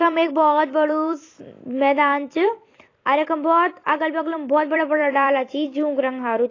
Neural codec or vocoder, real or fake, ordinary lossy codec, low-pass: vocoder, 44.1 kHz, 128 mel bands every 256 samples, BigVGAN v2; fake; AAC, 32 kbps; 7.2 kHz